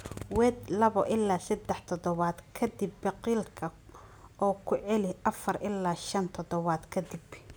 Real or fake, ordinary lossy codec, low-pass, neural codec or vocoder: real; none; none; none